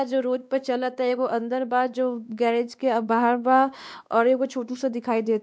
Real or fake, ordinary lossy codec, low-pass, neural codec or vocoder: fake; none; none; codec, 16 kHz, 2 kbps, X-Codec, WavLM features, trained on Multilingual LibriSpeech